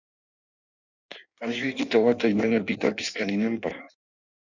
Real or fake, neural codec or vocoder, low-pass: fake; codec, 16 kHz in and 24 kHz out, 1.1 kbps, FireRedTTS-2 codec; 7.2 kHz